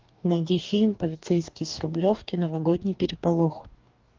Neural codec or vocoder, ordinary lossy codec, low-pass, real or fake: codec, 44.1 kHz, 2.6 kbps, DAC; Opus, 32 kbps; 7.2 kHz; fake